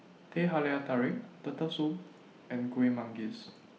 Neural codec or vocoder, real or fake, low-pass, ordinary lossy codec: none; real; none; none